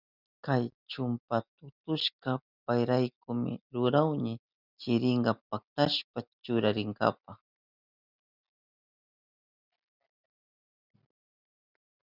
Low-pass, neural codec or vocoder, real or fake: 5.4 kHz; none; real